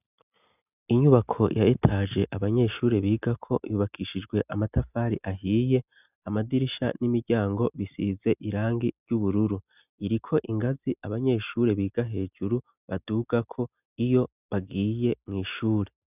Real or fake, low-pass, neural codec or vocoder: real; 3.6 kHz; none